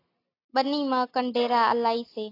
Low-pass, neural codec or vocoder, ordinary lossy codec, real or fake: 5.4 kHz; none; AAC, 32 kbps; real